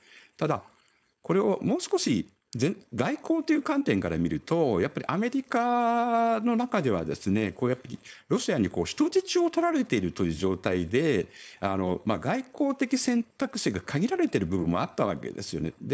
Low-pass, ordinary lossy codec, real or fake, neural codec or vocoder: none; none; fake; codec, 16 kHz, 4.8 kbps, FACodec